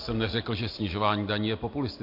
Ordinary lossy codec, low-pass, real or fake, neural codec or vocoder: MP3, 32 kbps; 5.4 kHz; real; none